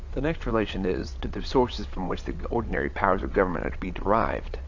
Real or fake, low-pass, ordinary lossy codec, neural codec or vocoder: fake; 7.2 kHz; AAC, 48 kbps; vocoder, 22.05 kHz, 80 mel bands, WaveNeXt